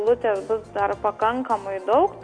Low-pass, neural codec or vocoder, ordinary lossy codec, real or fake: 9.9 kHz; none; MP3, 48 kbps; real